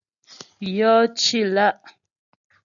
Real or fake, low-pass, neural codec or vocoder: real; 7.2 kHz; none